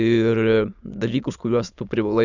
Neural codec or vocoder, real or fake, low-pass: autoencoder, 22.05 kHz, a latent of 192 numbers a frame, VITS, trained on many speakers; fake; 7.2 kHz